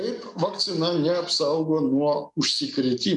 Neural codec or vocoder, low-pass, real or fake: codec, 44.1 kHz, 7.8 kbps, DAC; 10.8 kHz; fake